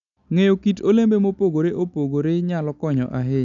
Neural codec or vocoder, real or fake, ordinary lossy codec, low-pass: none; real; none; 7.2 kHz